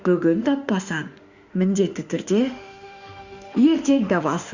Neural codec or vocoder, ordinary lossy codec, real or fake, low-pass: autoencoder, 48 kHz, 32 numbers a frame, DAC-VAE, trained on Japanese speech; Opus, 64 kbps; fake; 7.2 kHz